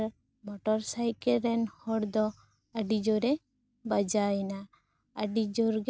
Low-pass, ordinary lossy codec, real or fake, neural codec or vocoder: none; none; real; none